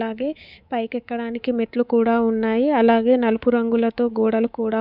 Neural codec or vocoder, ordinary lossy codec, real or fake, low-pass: codec, 24 kHz, 3.1 kbps, DualCodec; none; fake; 5.4 kHz